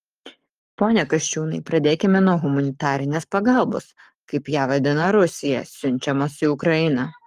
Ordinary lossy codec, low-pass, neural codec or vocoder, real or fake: Opus, 32 kbps; 14.4 kHz; codec, 44.1 kHz, 7.8 kbps, Pupu-Codec; fake